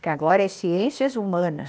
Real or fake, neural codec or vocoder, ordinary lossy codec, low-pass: fake; codec, 16 kHz, 0.8 kbps, ZipCodec; none; none